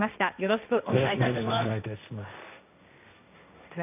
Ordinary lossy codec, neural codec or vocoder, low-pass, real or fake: none; codec, 16 kHz, 1.1 kbps, Voila-Tokenizer; 3.6 kHz; fake